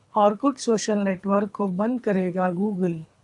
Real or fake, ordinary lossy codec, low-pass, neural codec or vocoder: fake; AAC, 64 kbps; 10.8 kHz; codec, 24 kHz, 3 kbps, HILCodec